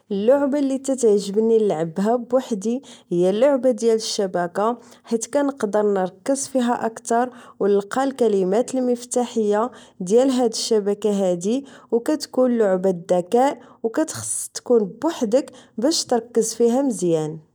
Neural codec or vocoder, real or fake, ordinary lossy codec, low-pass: none; real; none; none